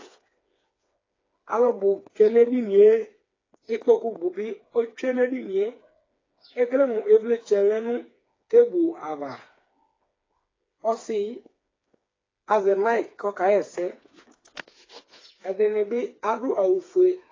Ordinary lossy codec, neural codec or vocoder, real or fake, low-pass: AAC, 32 kbps; codec, 16 kHz, 4 kbps, FreqCodec, smaller model; fake; 7.2 kHz